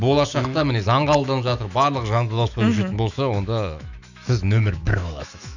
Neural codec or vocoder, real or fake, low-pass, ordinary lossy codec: autoencoder, 48 kHz, 128 numbers a frame, DAC-VAE, trained on Japanese speech; fake; 7.2 kHz; none